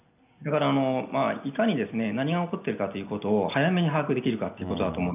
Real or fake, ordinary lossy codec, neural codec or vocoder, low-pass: real; none; none; 3.6 kHz